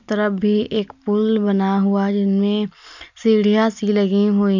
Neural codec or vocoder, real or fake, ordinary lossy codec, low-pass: none; real; none; 7.2 kHz